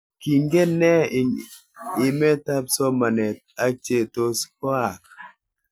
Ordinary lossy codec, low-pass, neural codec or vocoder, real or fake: none; none; none; real